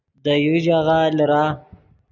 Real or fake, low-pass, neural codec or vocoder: real; 7.2 kHz; none